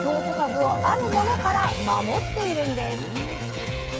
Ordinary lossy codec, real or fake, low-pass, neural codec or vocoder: none; fake; none; codec, 16 kHz, 8 kbps, FreqCodec, smaller model